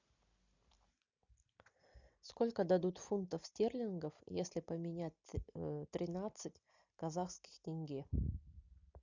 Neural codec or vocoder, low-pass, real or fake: none; 7.2 kHz; real